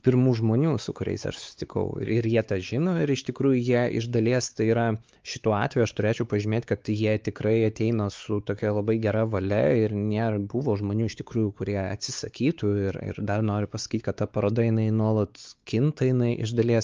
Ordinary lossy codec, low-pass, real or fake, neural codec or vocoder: Opus, 24 kbps; 7.2 kHz; fake; codec, 16 kHz, 4 kbps, X-Codec, WavLM features, trained on Multilingual LibriSpeech